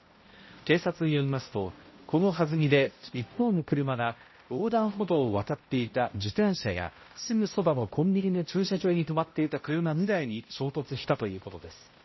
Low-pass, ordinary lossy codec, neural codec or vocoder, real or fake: 7.2 kHz; MP3, 24 kbps; codec, 16 kHz, 0.5 kbps, X-Codec, HuBERT features, trained on balanced general audio; fake